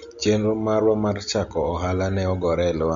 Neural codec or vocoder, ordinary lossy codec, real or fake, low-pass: none; MP3, 64 kbps; real; 7.2 kHz